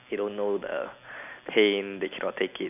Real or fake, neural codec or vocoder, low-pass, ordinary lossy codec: real; none; 3.6 kHz; none